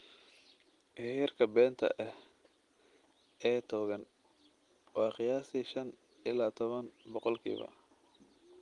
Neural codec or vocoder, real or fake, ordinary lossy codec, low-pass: none; real; Opus, 24 kbps; 10.8 kHz